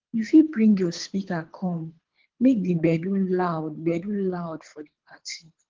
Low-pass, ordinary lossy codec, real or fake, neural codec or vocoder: 7.2 kHz; Opus, 16 kbps; fake; codec, 24 kHz, 3 kbps, HILCodec